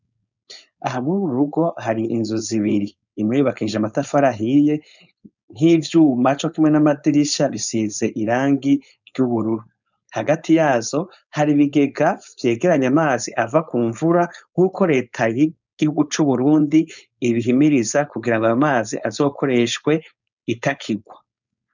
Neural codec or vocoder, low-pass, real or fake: codec, 16 kHz, 4.8 kbps, FACodec; 7.2 kHz; fake